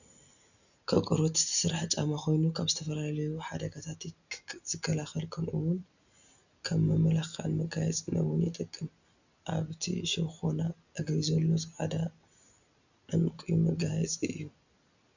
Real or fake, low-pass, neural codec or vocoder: real; 7.2 kHz; none